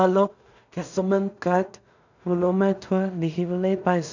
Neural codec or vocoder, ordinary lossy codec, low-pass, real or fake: codec, 16 kHz in and 24 kHz out, 0.4 kbps, LongCat-Audio-Codec, two codebook decoder; none; 7.2 kHz; fake